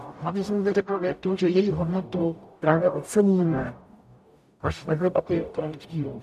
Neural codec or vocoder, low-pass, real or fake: codec, 44.1 kHz, 0.9 kbps, DAC; 14.4 kHz; fake